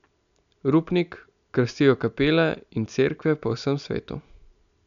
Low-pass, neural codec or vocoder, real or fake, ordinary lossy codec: 7.2 kHz; none; real; none